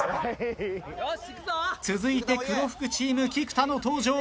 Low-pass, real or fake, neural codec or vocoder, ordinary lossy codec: none; real; none; none